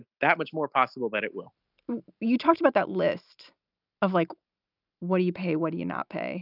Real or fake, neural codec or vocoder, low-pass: real; none; 5.4 kHz